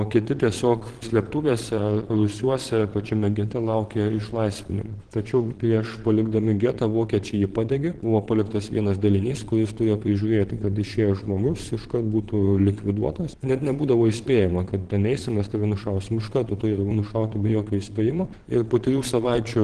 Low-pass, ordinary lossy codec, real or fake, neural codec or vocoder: 9.9 kHz; Opus, 16 kbps; fake; vocoder, 22.05 kHz, 80 mel bands, Vocos